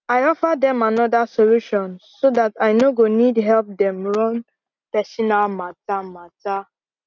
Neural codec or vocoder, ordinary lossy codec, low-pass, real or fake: none; none; 7.2 kHz; real